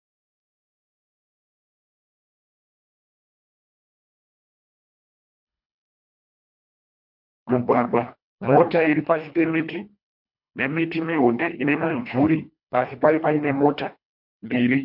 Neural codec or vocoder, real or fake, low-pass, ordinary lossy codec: codec, 24 kHz, 1.5 kbps, HILCodec; fake; 5.4 kHz; MP3, 48 kbps